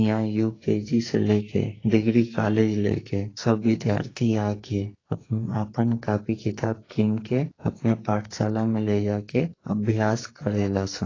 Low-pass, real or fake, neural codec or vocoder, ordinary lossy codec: 7.2 kHz; fake; codec, 44.1 kHz, 2.6 kbps, SNAC; AAC, 32 kbps